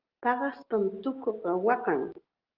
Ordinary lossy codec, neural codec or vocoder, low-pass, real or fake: Opus, 16 kbps; none; 5.4 kHz; real